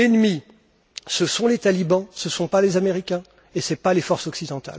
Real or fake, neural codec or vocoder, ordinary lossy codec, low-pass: real; none; none; none